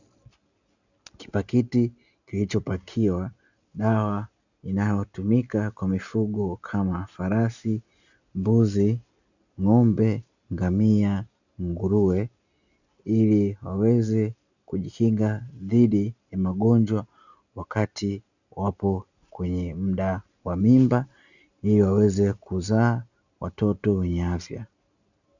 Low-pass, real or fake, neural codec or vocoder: 7.2 kHz; real; none